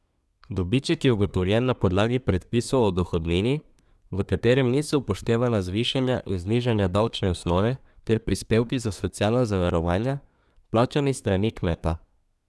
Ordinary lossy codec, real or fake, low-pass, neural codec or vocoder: none; fake; none; codec, 24 kHz, 1 kbps, SNAC